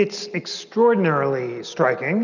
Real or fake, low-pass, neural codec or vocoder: real; 7.2 kHz; none